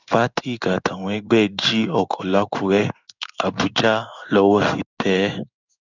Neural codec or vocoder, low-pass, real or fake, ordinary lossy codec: codec, 16 kHz in and 24 kHz out, 1 kbps, XY-Tokenizer; 7.2 kHz; fake; none